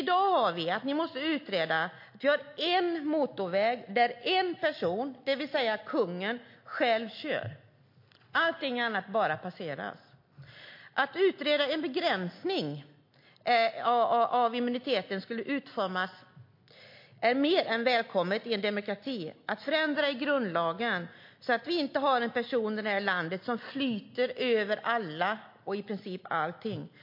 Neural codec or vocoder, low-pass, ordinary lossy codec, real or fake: none; 5.4 kHz; MP3, 32 kbps; real